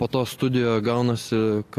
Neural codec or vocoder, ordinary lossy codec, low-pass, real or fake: none; AAC, 48 kbps; 14.4 kHz; real